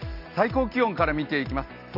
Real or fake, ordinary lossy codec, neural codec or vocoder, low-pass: real; none; none; 5.4 kHz